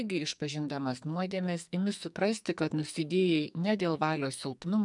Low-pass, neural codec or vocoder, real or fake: 10.8 kHz; codec, 44.1 kHz, 2.6 kbps, SNAC; fake